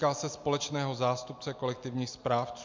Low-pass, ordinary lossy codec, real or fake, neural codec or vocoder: 7.2 kHz; MP3, 64 kbps; real; none